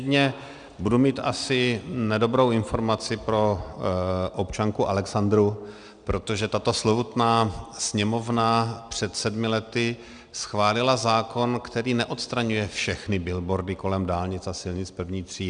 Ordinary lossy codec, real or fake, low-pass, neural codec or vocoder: Opus, 64 kbps; real; 9.9 kHz; none